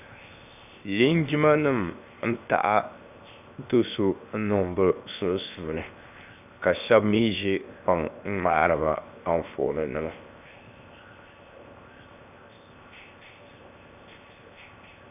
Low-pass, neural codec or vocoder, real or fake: 3.6 kHz; codec, 16 kHz, 0.7 kbps, FocalCodec; fake